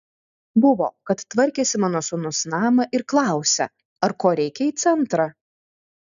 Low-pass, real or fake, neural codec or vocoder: 7.2 kHz; real; none